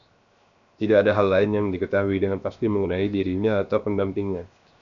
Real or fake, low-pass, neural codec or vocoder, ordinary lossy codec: fake; 7.2 kHz; codec, 16 kHz, 0.7 kbps, FocalCodec; MP3, 96 kbps